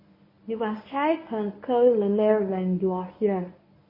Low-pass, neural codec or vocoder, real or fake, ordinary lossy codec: 5.4 kHz; codec, 24 kHz, 0.9 kbps, WavTokenizer, medium speech release version 1; fake; MP3, 24 kbps